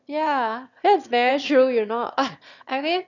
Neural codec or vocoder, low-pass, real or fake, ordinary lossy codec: autoencoder, 22.05 kHz, a latent of 192 numbers a frame, VITS, trained on one speaker; 7.2 kHz; fake; none